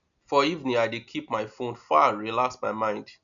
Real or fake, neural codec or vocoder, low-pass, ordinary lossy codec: real; none; 7.2 kHz; none